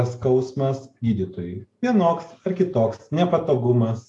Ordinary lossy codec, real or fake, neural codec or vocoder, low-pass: AAC, 48 kbps; real; none; 10.8 kHz